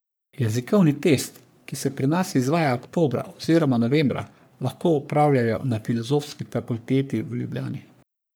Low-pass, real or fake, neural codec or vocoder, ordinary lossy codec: none; fake; codec, 44.1 kHz, 3.4 kbps, Pupu-Codec; none